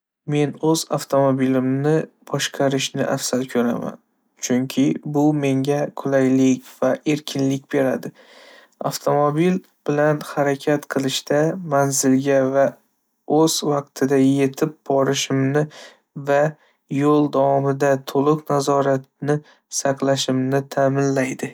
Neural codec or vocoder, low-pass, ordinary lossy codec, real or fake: none; none; none; real